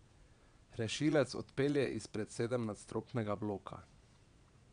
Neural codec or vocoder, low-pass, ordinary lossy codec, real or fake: vocoder, 22.05 kHz, 80 mel bands, Vocos; 9.9 kHz; none; fake